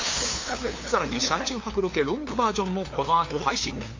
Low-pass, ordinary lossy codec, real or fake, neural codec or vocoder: 7.2 kHz; AAC, 32 kbps; fake; codec, 16 kHz, 2 kbps, FunCodec, trained on LibriTTS, 25 frames a second